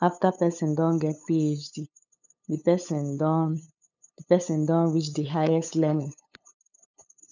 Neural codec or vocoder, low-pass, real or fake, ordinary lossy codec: codec, 16 kHz, 8 kbps, FunCodec, trained on LibriTTS, 25 frames a second; 7.2 kHz; fake; none